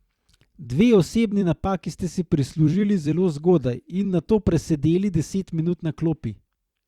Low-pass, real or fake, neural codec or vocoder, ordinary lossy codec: 19.8 kHz; fake; vocoder, 44.1 kHz, 128 mel bands every 256 samples, BigVGAN v2; Opus, 64 kbps